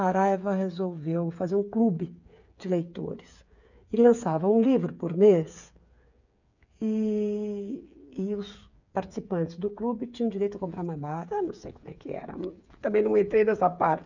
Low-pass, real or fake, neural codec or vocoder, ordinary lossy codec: 7.2 kHz; fake; codec, 16 kHz, 8 kbps, FreqCodec, smaller model; none